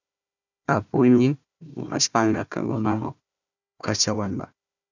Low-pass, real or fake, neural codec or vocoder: 7.2 kHz; fake; codec, 16 kHz, 1 kbps, FunCodec, trained on Chinese and English, 50 frames a second